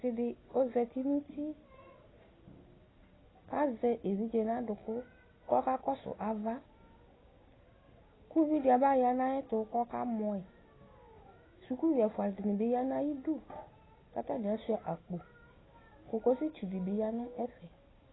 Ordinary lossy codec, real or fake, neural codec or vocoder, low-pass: AAC, 16 kbps; real; none; 7.2 kHz